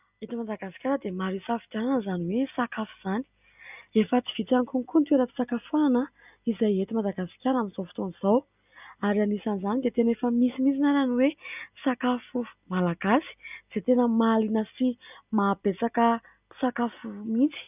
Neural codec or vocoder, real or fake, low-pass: none; real; 3.6 kHz